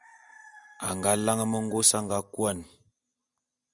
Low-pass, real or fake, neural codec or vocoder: 10.8 kHz; real; none